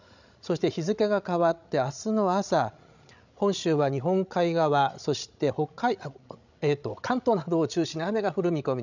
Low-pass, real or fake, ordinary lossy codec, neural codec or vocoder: 7.2 kHz; fake; none; codec, 16 kHz, 16 kbps, FreqCodec, larger model